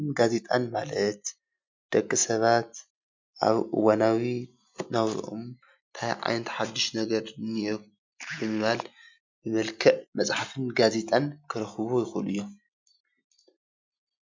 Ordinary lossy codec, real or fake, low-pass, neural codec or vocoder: MP3, 64 kbps; real; 7.2 kHz; none